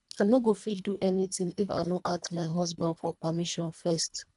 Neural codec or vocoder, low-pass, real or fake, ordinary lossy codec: codec, 24 kHz, 1.5 kbps, HILCodec; 10.8 kHz; fake; MP3, 96 kbps